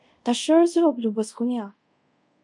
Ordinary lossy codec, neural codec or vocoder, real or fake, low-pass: MP3, 96 kbps; codec, 24 kHz, 0.5 kbps, DualCodec; fake; 10.8 kHz